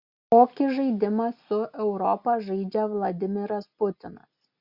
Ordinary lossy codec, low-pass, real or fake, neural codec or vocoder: Opus, 64 kbps; 5.4 kHz; real; none